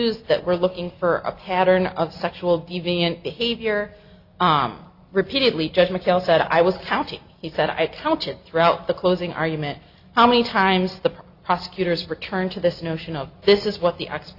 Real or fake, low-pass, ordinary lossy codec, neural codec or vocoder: real; 5.4 kHz; Opus, 64 kbps; none